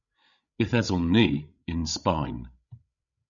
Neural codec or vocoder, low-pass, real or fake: codec, 16 kHz, 16 kbps, FreqCodec, larger model; 7.2 kHz; fake